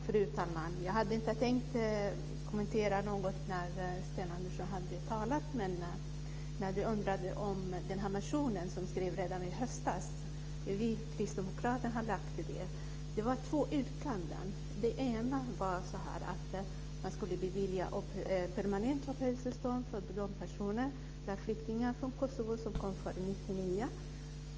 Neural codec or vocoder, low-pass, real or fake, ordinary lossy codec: autoencoder, 48 kHz, 128 numbers a frame, DAC-VAE, trained on Japanese speech; 7.2 kHz; fake; Opus, 24 kbps